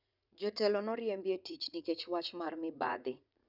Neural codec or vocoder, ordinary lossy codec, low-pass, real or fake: vocoder, 44.1 kHz, 80 mel bands, Vocos; none; 5.4 kHz; fake